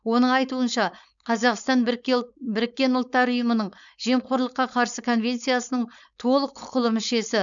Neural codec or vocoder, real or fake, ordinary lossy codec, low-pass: codec, 16 kHz, 4.8 kbps, FACodec; fake; none; 7.2 kHz